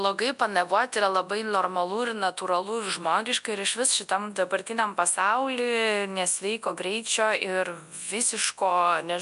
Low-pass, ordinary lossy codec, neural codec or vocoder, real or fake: 10.8 kHz; AAC, 96 kbps; codec, 24 kHz, 0.9 kbps, WavTokenizer, large speech release; fake